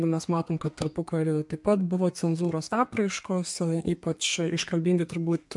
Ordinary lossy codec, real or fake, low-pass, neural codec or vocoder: MP3, 64 kbps; fake; 10.8 kHz; codec, 32 kHz, 1.9 kbps, SNAC